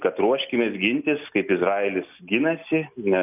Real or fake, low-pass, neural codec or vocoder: real; 3.6 kHz; none